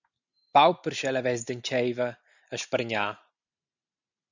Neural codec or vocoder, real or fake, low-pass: none; real; 7.2 kHz